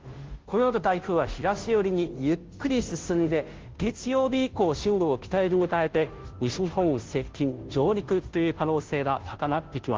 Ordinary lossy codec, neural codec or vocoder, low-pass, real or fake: Opus, 16 kbps; codec, 16 kHz, 0.5 kbps, FunCodec, trained on Chinese and English, 25 frames a second; 7.2 kHz; fake